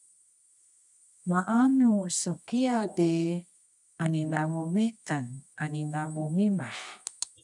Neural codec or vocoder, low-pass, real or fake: codec, 24 kHz, 0.9 kbps, WavTokenizer, medium music audio release; 10.8 kHz; fake